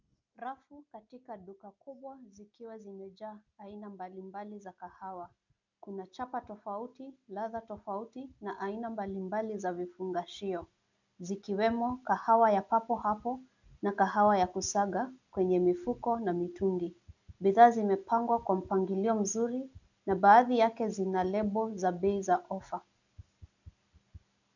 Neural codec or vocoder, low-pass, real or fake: none; 7.2 kHz; real